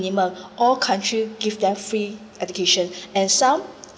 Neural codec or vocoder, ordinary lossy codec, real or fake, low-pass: none; none; real; none